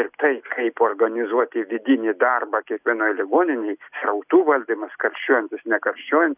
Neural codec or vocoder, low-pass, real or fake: none; 3.6 kHz; real